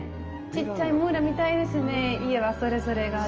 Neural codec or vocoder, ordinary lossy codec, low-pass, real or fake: none; Opus, 24 kbps; 7.2 kHz; real